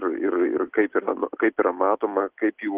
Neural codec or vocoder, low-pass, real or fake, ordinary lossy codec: none; 3.6 kHz; real; Opus, 16 kbps